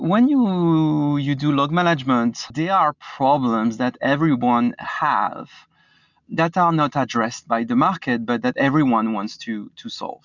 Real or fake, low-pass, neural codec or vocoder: real; 7.2 kHz; none